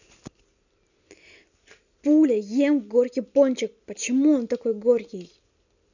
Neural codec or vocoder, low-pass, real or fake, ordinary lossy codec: none; 7.2 kHz; real; none